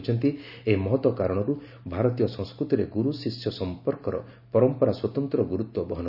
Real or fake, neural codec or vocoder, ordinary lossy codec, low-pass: real; none; none; 5.4 kHz